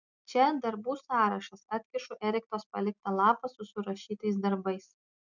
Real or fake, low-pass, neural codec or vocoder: real; 7.2 kHz; none